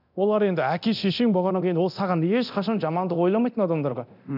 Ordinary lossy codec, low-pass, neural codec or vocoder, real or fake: none; 5.4 kHz; codec, 24 kHz, 0.9 kbps, DualCodec; fake